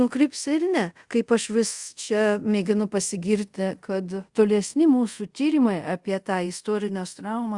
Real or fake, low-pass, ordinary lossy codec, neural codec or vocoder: fake; 10.8 kHz; Opus, 64 kbps; codec, 24 kHz, 0.5 kbps, DualCodec